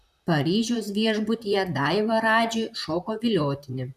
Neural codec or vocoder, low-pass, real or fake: vocoder, 44.1 kHz, 128 mel bands, Pupu-Vocoder; 14.4 kHz; fake